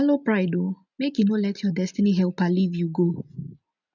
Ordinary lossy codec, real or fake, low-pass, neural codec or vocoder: none; real; 7.2 kHz; none